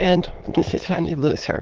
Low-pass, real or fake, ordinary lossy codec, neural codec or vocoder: 7.2 kHz; fake; Opus, 16 kbps; autoencoder, 22.05 kHz, a latent of 192 numbers a frame, VITS, trained on many speakers